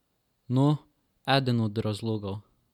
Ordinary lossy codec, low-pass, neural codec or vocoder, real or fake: none; 19.8 kHz; none; real